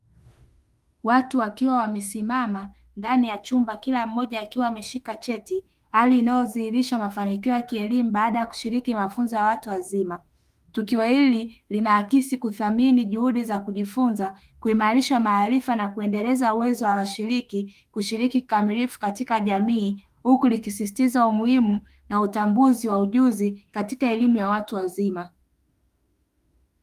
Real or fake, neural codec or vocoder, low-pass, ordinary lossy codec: fake; autoencoder, 48 kHz, 32 numbers a frame, DAC-VAE, trained on Japanese speech; 14.4 kHz; Opus, 32 kbps